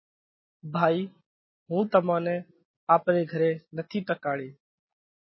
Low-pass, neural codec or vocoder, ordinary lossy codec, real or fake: 7.2 kHz; none; MP3, 24 kbps; real